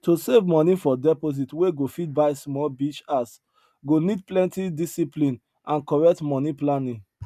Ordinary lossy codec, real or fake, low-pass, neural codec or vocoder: none; real; 14.4 kHz; none